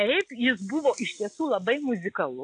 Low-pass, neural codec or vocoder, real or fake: 9.9 kHz; none; real